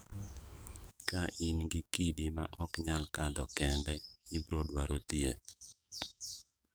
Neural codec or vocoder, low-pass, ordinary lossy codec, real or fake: codec, 44.1 kHz, 7.8 kbps, DAC; none; none; fake